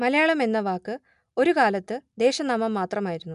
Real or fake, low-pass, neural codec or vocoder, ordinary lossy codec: real; 10.8 kHz; none; MP3, 96 kbps